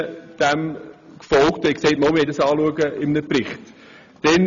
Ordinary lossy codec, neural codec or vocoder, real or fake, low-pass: none; none; real; 7.2 kHz